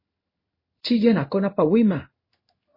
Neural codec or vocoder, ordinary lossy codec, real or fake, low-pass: codec, 16 kHz in and 24 kHz out, 1 kbps, XY-Tokenizer; MP3, 24 kbps; fake; 5.4 kHz